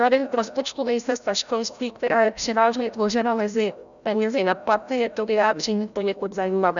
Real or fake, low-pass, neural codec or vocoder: fake; 7.2 kHz; codec, 16 kHz, 0.5 kbps, FreqCodec, larger model